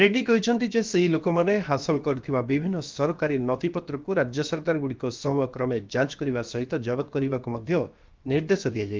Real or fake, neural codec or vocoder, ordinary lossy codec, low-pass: fake; codec, 16 kHz, about 1 kbps, DyCAST, with the encoder's durations; Opus, 32 kbps; 7.2 kHz